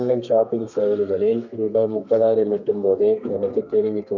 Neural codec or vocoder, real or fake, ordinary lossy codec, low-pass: codec, 32 kHz, 1.9 kbps, SNAC; fake; none; 7.2 kHz